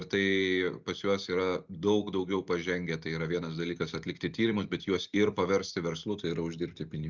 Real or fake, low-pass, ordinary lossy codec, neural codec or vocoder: real; 7.2 kHz; Opus, 64 kbps; none